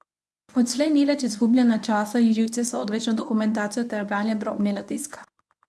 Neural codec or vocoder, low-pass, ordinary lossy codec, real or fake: codec, 24 kHz, 0.9 kbps, WavTokenizer, medium speech release version 1; none; none; fake